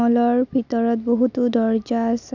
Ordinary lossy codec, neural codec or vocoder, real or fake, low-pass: none; none; real; 7.2 kHz